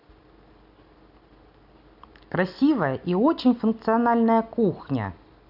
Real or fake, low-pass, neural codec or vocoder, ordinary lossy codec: real; 5.4 kHz; none; none